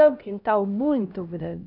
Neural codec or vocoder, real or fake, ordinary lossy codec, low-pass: codec, 16 kHz, 1 kbps, X-Codec, HuBERT features, trained on LibriSpeech; fake; none; 5.4 kHz